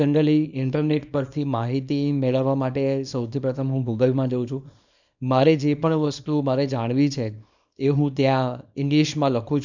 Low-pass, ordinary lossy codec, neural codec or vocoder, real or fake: 7.2 kHz; none; codec, 24 kHz, 0.9 kbps, WavTokenizer, small release; fake